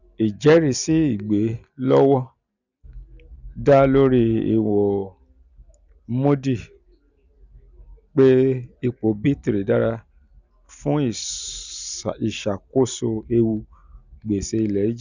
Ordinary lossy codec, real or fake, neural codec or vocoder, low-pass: none; real; none; 7.2 kHz